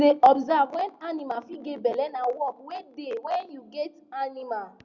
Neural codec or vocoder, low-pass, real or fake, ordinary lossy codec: none; 7.2 kHz; real; Opus, 64 kbps